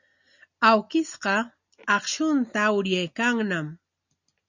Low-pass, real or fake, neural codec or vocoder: 7.2 kHz; real; none